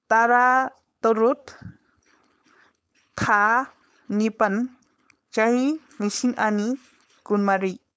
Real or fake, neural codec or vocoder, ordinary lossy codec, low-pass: fake; codec, 16 kHz, 4.8 kbps, FACodec; none; none